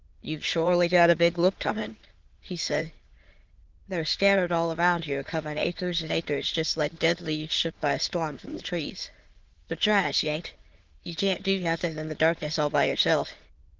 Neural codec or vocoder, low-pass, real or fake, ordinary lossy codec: autoencoder, 22.05 kHz, a latent of 192 numbers a frame, VITS, trained on many speakers; 7.2 kHz; fake; Opus, 16 kbps